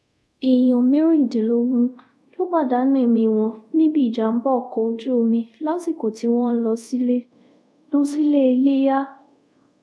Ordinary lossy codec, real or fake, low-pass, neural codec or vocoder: none; fake; none; codec, 24 kHz, 0.5 kbps, DualCodec